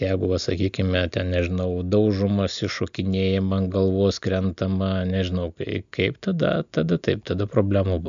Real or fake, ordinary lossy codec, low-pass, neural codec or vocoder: real; MP3, 64 kbps; 7.2 kHz; none